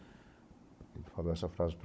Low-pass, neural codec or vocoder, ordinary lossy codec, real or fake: none; codec, 16 kHz, 16 kbps, FunCodec, trained on Chinese and English, 50 frames a second; none; fake